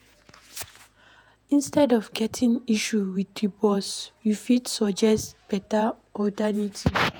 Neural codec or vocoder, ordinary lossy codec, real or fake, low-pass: vocoder, 48 kHz, 128 mel bands, Vocos; none; fake; none